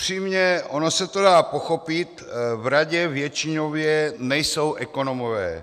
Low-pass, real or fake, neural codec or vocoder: 14.4 kHz; real; none